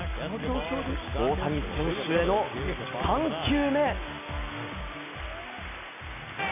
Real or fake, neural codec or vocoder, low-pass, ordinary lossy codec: real; none; 3.6 kHz; none